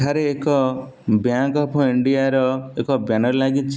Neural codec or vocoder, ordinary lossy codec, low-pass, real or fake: none; none; none; real